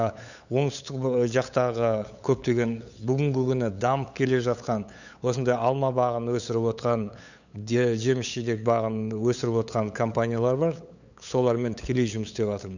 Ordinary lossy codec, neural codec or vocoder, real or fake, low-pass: none; codec, 16 kHz, 8 kbps, FunCodec, trained on LibriTTS, 25 frames a second; fake; 7.2 kHz